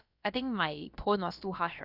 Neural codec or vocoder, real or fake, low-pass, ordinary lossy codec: codec, 16 kHz, about 1 kbps, DyCAST, with the encoder's durations; fake; 5.4 kHz; none